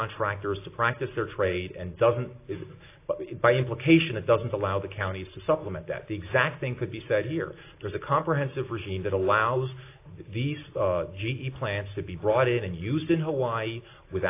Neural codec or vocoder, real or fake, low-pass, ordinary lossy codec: none; real; 3.6 kHz; AAC, 24 kbps